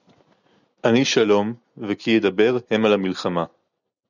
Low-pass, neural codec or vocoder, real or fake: 7.2 kHz; none; real